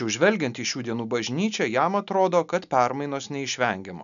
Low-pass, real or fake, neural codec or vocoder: 7.2 kHz; real; none